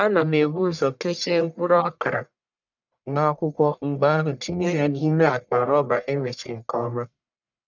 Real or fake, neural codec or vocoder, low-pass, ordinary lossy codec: fake; codec, 44.1 kHz, 1.7 kbps, Pupu-Codec; 7.2 kHz; none